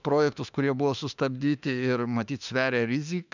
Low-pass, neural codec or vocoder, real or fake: 7.2 kHz; autoencoder, 48 kHz, 32 numbers a frame, DAC-VAE, trained on Japanese speech; fake